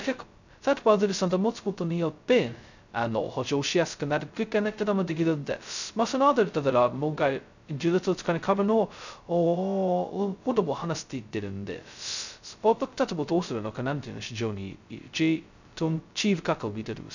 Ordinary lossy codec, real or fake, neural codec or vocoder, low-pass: none; fake; codec, 16 kHz, 0.2 kbps, FocalCodec; 7.2 kHz